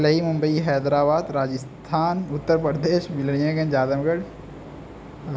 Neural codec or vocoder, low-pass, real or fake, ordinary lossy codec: none; none; real; none